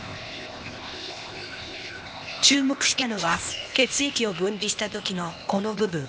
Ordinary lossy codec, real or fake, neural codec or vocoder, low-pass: none; fake; codec, 16 kHz, 0.8 kbps, ZipCodec; none